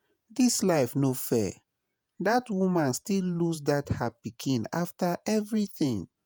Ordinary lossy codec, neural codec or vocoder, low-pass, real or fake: none; vocoder, 48 kHz, 128 mel bands, Vocos; none; fake